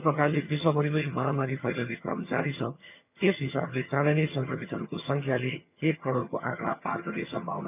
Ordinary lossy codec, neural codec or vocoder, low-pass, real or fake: none; vocoder, 22.05 kHz, 80 mel bands, HiFi-GAN; 3.6 kHz; fake